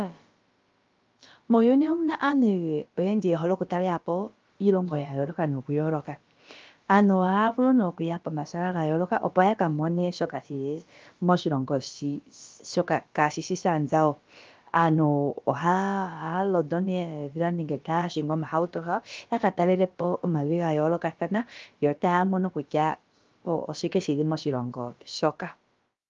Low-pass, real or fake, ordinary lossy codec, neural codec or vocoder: 7.2 kHz; fake; Opus, 32 kbps; codec, 16 kHz, about 1 kbps, DyCAST, with the encoder's durations